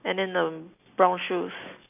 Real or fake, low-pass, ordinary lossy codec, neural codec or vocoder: real; 3.6 kHz; none; none